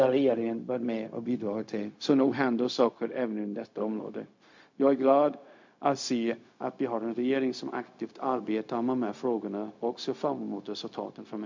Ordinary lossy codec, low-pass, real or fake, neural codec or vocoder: MP3, 48 kbps; 7.2 kHz; fake; codec, 16 kHz, 0.4 kbps, LongCat-Audio-Codec